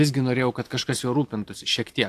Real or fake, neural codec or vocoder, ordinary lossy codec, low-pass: real; none; AAC, 64 kbps; 14.4 kHz